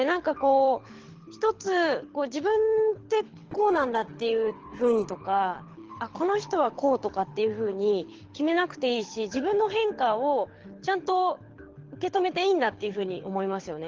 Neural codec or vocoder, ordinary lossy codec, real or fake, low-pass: codec, 24 kHz, 6 kbps, HILCodec; Opus, 16 kbps; fake; 7.2 kHz